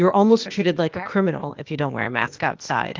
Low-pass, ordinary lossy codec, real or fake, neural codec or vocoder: 7.2 kHz; Opus, 24 kbps; fake; codec, 16 kHz, 0.8 kbps, ZipCodec